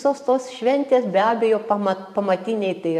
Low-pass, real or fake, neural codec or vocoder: 14.4 kHz; fake; vocoder, 44.1 kHz, 128 mel bands every 512 samples, BigVGAN v2